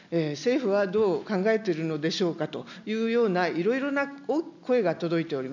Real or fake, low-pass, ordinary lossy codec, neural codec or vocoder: real; 7.2 kHz; none; none